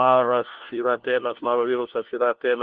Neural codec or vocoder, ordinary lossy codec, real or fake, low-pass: codec, 16 kHz, 1 kbps, FunCodec, trained on LibriTTS, 50 frames a second; Opus, 16 kbps; fake; 7.2 kHz